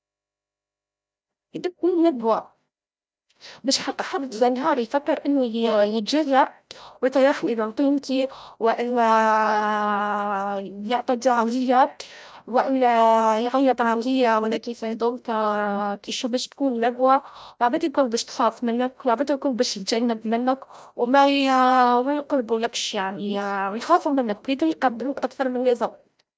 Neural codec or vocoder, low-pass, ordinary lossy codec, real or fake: codec, 16 kHz, 0.5 kbps, FreqCodec, larger model; none; none; fake